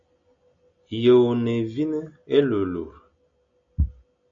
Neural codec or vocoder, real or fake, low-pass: none; real; 7.2 kHz